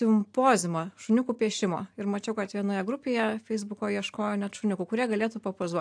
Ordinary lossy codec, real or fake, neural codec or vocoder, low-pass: AAC, 64 kbps; real; none; 9.9 kHz